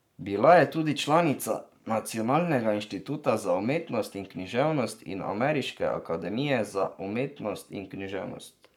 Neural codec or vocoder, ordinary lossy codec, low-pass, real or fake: codec, 44.1 kHz, 7.8 kbps, Pupu-Codec; none; 19.8 kHz; fake